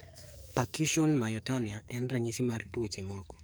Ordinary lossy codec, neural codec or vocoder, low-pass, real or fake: none; codec, 44.1 kHz, 2.6 kbps, SNAC; none; fake